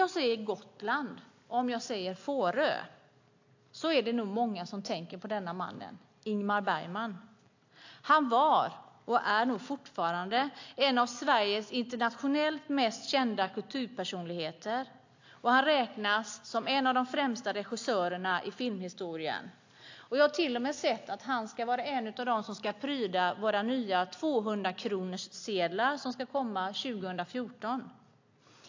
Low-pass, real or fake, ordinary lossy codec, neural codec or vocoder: 7.2 kHz; real; AAC, 48 kbps; none